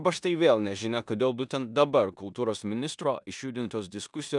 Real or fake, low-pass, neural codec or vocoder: fake; 10.8 kHz; codec, 16 kHz in and 24 kHz out, 0.9 kbps, LongCat-Audio-Codec, four codebook decoder